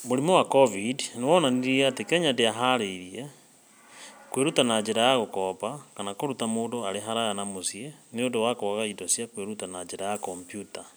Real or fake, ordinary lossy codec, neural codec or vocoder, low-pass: real; none; none; none